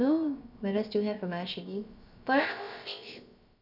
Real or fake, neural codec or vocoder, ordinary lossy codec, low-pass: fake; codec, 16 kHz, about 1 kbps, DyCAST, with the encoder's durations; none; 5.4 kHz